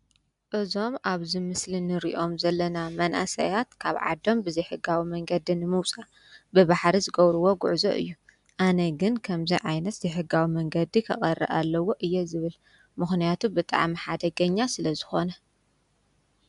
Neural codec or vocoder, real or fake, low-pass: none; real; 10.8 kHz